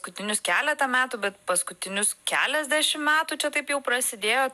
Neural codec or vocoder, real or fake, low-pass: none; real; 14.4 kHz